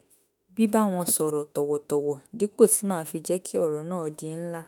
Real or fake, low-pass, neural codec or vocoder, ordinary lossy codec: fake; none; autoencoder, 48 kHz, 32 numbers a frame, DAC-VAE, trained on Japanese speech; none